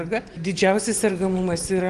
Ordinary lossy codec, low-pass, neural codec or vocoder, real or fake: Opus, 24 kbps; 10.8 kHz; none; real